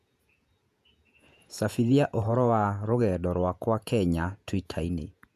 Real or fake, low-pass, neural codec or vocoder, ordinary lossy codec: fake; 14.4 kHz; vocoder, 48 kHz, 128 mel bands, Vocos; none